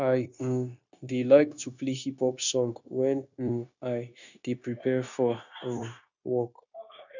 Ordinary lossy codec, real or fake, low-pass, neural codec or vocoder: none; fake; 7.2 kHz; codec, 16 kHz, 0.9 kbps, LongCat-Audio-Codec